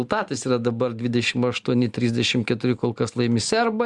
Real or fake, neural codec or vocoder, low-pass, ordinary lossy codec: real; none; 10.8 kHz; Opus, 64 kbps